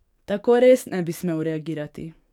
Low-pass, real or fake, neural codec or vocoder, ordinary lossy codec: 19.8 kHz; fake; codec, 44.1 kHz, 7.8 kbps, DAC; none